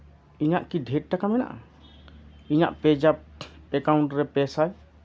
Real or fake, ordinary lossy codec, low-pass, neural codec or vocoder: real; none; none; none